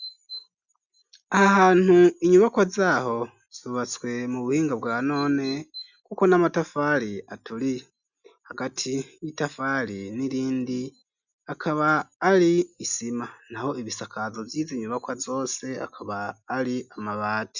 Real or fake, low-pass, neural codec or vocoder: real; 7.2 kHz; none